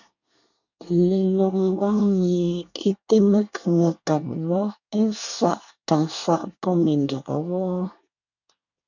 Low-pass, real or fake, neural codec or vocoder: 7.2 kHz; fake; codec, 24 kHz, 1 kbps, SNAC